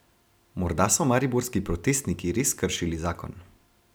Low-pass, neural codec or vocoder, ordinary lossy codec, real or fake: none; none; none; real